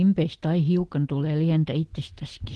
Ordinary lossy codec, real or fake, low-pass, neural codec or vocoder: Opus, 16 kbps; real; 10.8 kHz; none